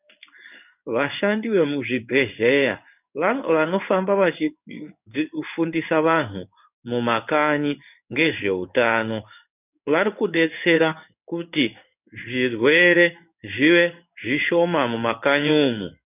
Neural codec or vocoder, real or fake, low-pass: codec, 16 kHz in and 24 kHz out, 1 kbps, XY-Tokenizer; fake; 3.6 kHz